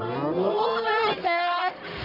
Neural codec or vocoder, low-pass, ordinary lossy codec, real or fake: codec, 44.1 kHz, 1.7 kbps, Pupu-Codec; 5.4 kHz; none; fake